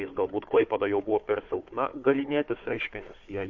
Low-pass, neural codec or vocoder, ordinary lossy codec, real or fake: 7.2 kHz; codec, 16 kHz, 4 kbps, FunCodec, trained on Chinese and English, 50 frames a second; MP3, 48 kbps; fake